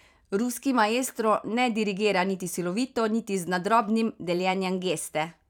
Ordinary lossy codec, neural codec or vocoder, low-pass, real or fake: none; vocoder, 44.1 kHz, 128 mel bands every 512 samples, BigVGAN v2; 19.8 kHz; fake